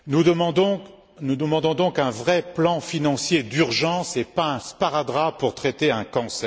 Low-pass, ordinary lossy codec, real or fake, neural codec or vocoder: none; none; real; none